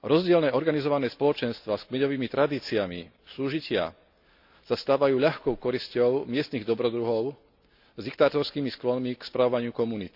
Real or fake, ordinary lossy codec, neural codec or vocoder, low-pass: real; none; none; 5.4 kHz